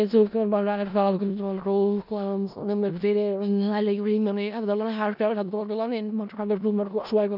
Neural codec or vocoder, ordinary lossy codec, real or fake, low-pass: codec, 16 kHz in and 24 kHz out, 0.4 kbps, LongCat-Audio-Codec, four codebook decoder; none; fake; 5.4 kHz